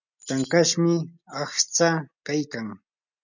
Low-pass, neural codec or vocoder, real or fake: 7.2 kHz; none; real